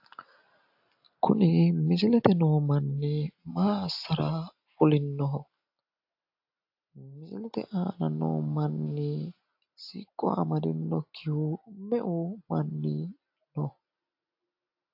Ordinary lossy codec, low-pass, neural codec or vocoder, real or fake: AAC, 48 kbps; 5.4 kHz; none; real